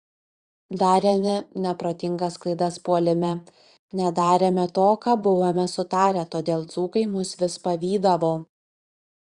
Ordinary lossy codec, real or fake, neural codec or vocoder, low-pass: Opus, 64 kbps; fake; vocoder, 22.05 kHz, 80 mel bands, Vocos; 9.9 kHz